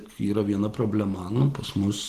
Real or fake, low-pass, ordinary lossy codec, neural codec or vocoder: real; 14.4 kHz; Opus, 16 kbps; none